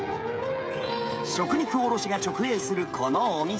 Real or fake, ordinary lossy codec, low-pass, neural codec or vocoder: fake; none; none; codec, 16 kHz, 16 kbps, FreqCodec, smaller model